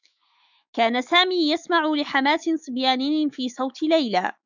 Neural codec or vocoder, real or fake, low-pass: autoencoder, 48 kHz, 128 numbers a frame, DAC-VAE, trained on Japanese speech; fake; 7.2 kHz